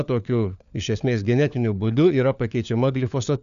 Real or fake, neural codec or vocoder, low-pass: fake; codec, 16 kHz, 4 kbps, FunCodec, trained on LibriTTS, 50 frames a second; 7.2 kHz